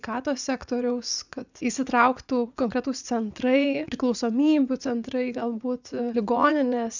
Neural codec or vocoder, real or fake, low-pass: vocoder, 44.1 kHz, 80 mel bands, Vocos; fake; 7.2 kHz